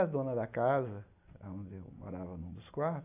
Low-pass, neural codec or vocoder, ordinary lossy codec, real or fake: 3.6 kHz; none; AAC, 24 kbps; real